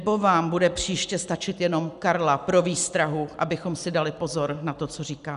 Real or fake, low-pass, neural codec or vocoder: real; 10.8 kHz; none